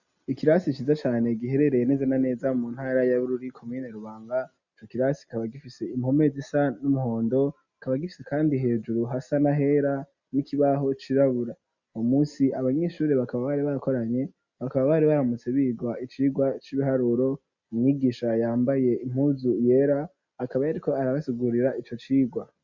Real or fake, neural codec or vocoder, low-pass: real; none; 7.2 kHz